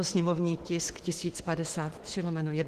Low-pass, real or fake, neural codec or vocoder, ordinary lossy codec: 14.4 kHz; fake; autoencoder, 48 kHz, 32 numbers a frame, DAC-VAE, trained on Japanese speech; Opus, 16 kbps